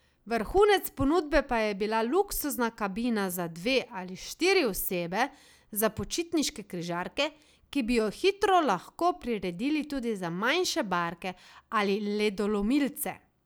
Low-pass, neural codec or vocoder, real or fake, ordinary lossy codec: none; none; real; none